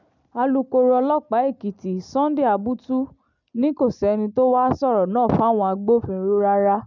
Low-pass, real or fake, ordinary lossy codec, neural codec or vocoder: 7.2 kHz; real; none; none